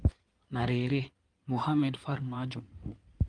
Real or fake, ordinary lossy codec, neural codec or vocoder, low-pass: fake; Opus, 32 kbps; codec, 16 kHz in and 24 kHz out, 2.2 kbps, FireRedTTS-2 codec; 9.9 kHz